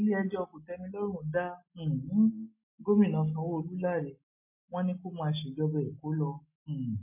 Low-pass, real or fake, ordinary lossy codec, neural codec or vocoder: 3.6 kHz; real; MP3, 24 kbps; none